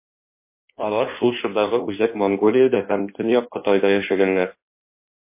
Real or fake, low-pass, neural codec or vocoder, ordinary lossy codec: fake; 3.6 kHz; codec, 16 kHz in and 24 kHz out, 1.1 kbps, FireRedTTS-2 codec; MP3, 24 kbps